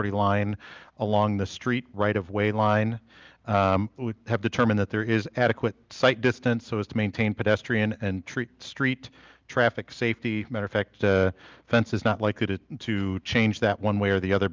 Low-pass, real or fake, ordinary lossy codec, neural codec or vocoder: 7.2 kHz; real; Opus, 24 kbps; none